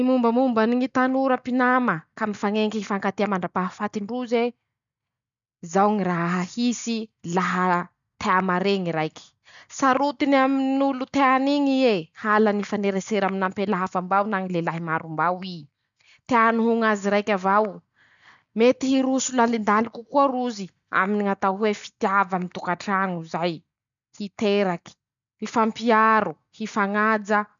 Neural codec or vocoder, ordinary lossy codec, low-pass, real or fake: none; none; 7.2 kHz; real